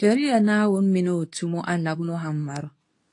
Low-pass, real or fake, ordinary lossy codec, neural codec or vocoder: 10.8 kHz; fake; AAC, 48 kbps; codec, 24 kHz, 0.9 kbps, WavTokenizer, medium speech release version 2